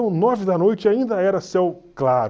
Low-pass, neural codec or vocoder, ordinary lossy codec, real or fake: none; none; none; real